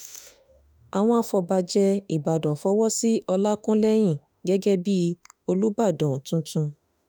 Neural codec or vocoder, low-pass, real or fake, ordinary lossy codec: autoencoder, 48 kHz, 32 numbers a frame, DAC-VAE, trained on Japanese speech; none; fake; none